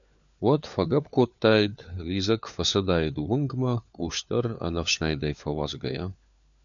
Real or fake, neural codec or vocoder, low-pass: fake; codec, 16 kHz, 4 kbps, FunCodec, trained on LibriTTS, 50 frames a second; 7.2 kHz